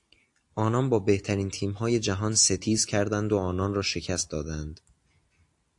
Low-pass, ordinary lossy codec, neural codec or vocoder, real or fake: 10.8 kHz; AAC, 64 kbps; none; real